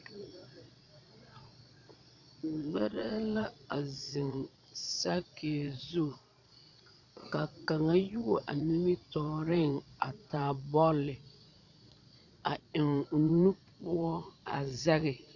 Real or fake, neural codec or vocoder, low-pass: fake; vocoder, 22.05 kHz, 80 mel bands, Vocos; 7.2 kHz